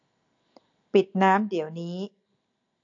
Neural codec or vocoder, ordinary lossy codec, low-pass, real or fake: none; AAC, 48 kbps; 7.2 kHz; real